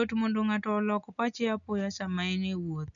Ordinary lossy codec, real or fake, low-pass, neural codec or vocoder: none; real; 7.2 kHz; none